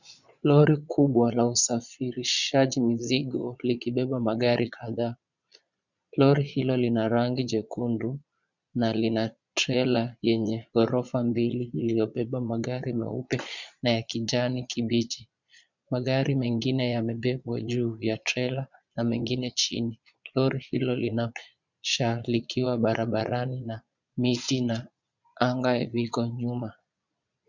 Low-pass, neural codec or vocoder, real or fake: 7.2 kHz; vocoder, 22.05 kHz, 80 mel bands, Vocos; fake